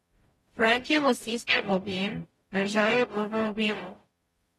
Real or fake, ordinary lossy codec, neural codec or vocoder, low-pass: fake; AAC, 32 kbps; codec, 44.1 kHz, 0.9 kbps, DAC; 19.8 kHz